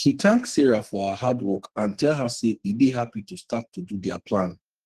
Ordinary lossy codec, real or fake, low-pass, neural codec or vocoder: Opus, 16 kbps; fake; 14.4 kHz; codec, 32 kHz, 1.9 kbps, SNAC